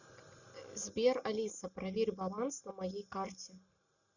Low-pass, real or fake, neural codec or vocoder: 7.2 kHz; real; none